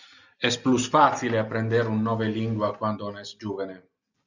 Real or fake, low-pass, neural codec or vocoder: real; 7.2 kHz; none